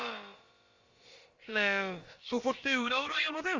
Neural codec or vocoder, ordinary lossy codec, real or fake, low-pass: codec, 16 kHz, about 1 kbps, DyCAST, with the encoder's durations; Opus, 32 kbps; fake; 7.2 kHz